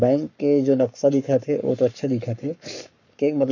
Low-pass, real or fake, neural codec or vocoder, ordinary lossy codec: 7.2 kHz; fake; codec, 44.1 kHz, 7.8 kbps, Pupu-Codec; none